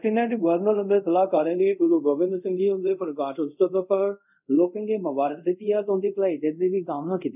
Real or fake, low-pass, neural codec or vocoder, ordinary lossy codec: fake; 3.6 kHz; codec, 24 kHz, 0.5 kbps, DualCodec; none